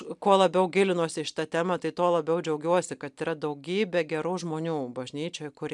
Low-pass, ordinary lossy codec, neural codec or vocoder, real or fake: 10.8 kHz; MP3, 96 kbps; none; real